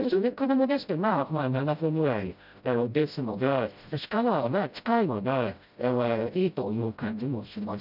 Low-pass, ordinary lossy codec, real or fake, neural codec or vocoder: 5.4 kHz; none; fake; codec, 16 kHz, 0.5 kbps, FreqCodec, smaller model